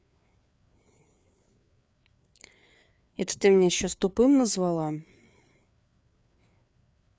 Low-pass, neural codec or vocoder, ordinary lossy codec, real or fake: none; codec, 16 kHz, 4 kbps, FreqCodec, larger model; none; fake